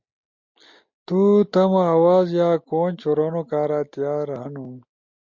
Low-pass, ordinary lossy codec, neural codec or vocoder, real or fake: 7.2 kHz; MP3, 48 kbps; none; real